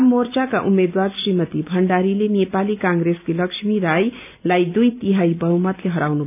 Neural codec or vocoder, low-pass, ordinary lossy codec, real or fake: none; 3.6 kHz; none; real